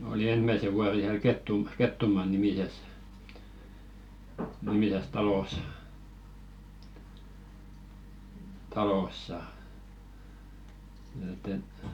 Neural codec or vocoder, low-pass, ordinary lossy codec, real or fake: none; 19.8 kHz; none; real